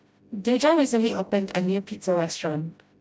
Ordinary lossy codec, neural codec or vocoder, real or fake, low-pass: none; codec, 16 kHz, 0.5 kbps, FreqCodec, smaller model; fake; none